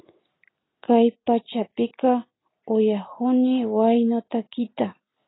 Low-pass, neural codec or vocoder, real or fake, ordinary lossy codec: 7.2 kHz; none; real; AAC, 16 kbps